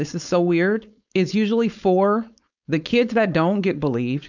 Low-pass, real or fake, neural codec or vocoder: 7.2 kHz; fake; codec, 16 kHz, 4.8 kbps, FACodec